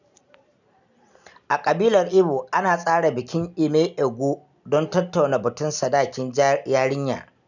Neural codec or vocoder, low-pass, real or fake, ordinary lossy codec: none; 7.2 kHz; real; none